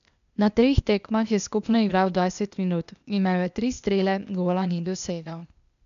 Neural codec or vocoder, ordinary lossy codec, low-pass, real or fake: codec, 16 kHz, 0.8 kbps, ZipCodec; none; 7.2 kHz; fake